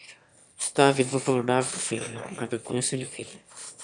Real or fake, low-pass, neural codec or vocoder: fake; 9.9 kHz; autoencoder, 22.05 kHz, a latent of 192 numbers a frame, VITS, trained on one speaker